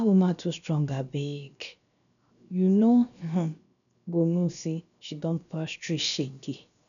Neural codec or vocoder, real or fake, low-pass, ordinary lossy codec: codec, 16 kHz, 0.7 kbps, FocalCodec; fake; 7.2 kHz; none